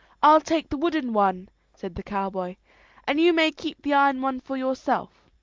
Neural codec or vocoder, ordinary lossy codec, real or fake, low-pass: none; Opus, 32 kbps; real; 7.2 kHz